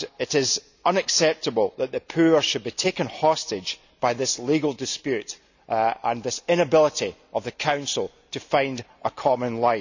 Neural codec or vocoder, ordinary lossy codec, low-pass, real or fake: none; none; 7.2 kHz; real